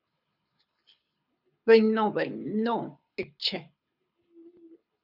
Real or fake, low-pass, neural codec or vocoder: fake; 5.4 kHz; codec, 24 kHz, 6 kbps, HILCodec